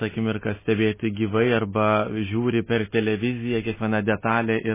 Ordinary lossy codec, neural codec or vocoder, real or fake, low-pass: MP3, 16 kbps; none; real; 3.6 kHz